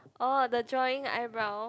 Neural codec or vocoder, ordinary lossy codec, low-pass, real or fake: none; none; none; real